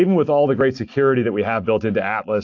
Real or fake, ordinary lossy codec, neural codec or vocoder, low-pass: real; AAC, 48 kbps; none; 7.2 kHz